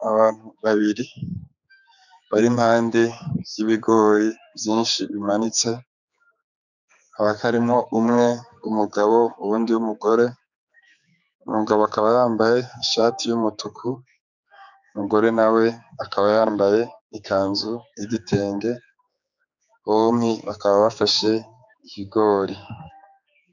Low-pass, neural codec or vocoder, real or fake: 7.2 kHz; codec, 16 kHz, 4 kbps, X-Codec, HuBERT features, trained on general audio; fake